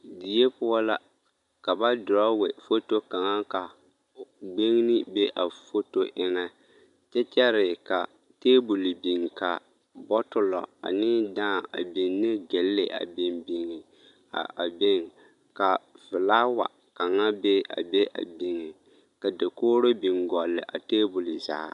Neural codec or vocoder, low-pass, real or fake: none; 10.8 kHz; real